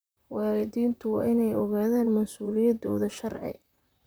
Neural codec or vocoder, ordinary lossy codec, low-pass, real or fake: vocoder, 44.1 kHz, 128 mel bands, Pupu-Vocoder; none; none; fake